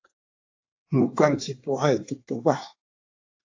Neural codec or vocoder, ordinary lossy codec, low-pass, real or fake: codec, 24 kHz, 1 kbps, SNAC; AAC, 48 kbps; 7.2 kHz; fake